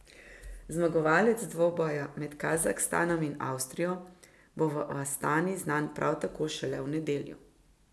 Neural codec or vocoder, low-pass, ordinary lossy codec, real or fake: none; none; none; real